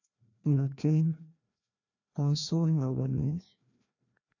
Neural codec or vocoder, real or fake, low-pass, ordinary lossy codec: codec, 16 kHz, 1 kbps, FreqCodec, larger model; fake; 7.2 kHz; none